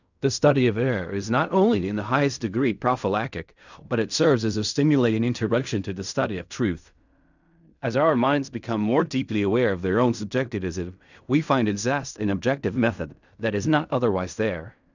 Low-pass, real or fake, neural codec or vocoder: 7.2 kHz; fake; codec, 16 kHz in and 24 kHz out, 0.4 kbps, LongCat-Audio-Codec, fine tuned four codebook decoder